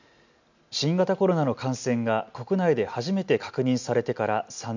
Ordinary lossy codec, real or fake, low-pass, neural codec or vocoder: none; real; 7.2 kHz; none